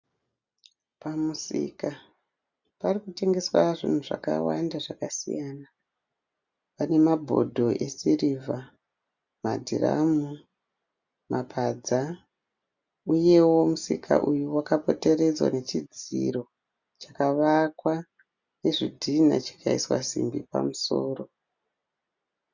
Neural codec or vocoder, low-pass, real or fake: none; 7.2 kHz; real